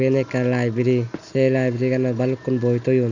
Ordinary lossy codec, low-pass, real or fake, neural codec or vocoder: none; 7.2 kHz; real; none